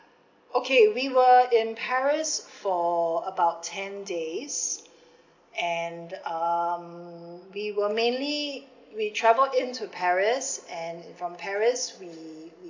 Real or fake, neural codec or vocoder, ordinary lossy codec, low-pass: real; none; AAC, 48 kbps; 7.2 kHz